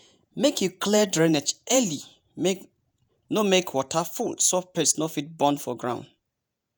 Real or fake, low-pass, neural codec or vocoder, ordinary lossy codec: fake; none; vocoder, 48 kHz, 128 mel bands, Vocos; none